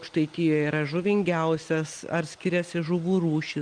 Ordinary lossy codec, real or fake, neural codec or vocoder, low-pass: Opus, 32 kbps; real; none; 9.9 kHz